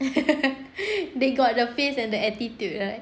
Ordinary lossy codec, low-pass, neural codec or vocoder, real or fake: none; none; none; real